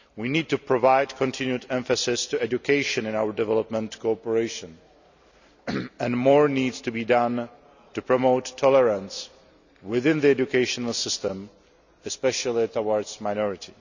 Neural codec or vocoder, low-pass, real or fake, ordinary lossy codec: none; 7.2 kHz; real; none